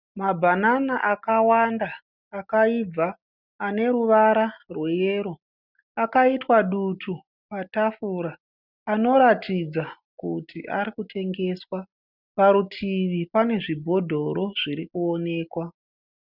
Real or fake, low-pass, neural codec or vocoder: real; 5.4 kHz; none